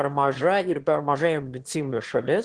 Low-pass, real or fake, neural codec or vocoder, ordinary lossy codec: 9.9 kHz; fake; autoencoder, 22.05 kHz, a latent of 192 numbers a frame, VITS, trained on one speaker; Opus, 16 kbps